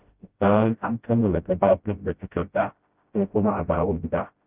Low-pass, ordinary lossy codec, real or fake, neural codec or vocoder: 3.6 kHz; Opus, 32 kbps; fake; codec, 16 kHz, 0.5 kbps, FreqCodec, smaller model